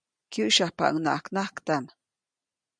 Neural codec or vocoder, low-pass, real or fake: none; 9.9 kHz; real